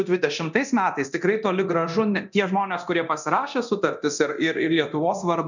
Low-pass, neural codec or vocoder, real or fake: 7.2 kHz; codec, 24 kHz, 0.9 kbps, DualCodec; fake